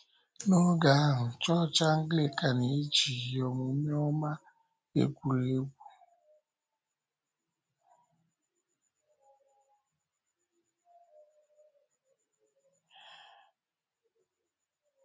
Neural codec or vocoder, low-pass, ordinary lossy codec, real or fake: none; none; none; real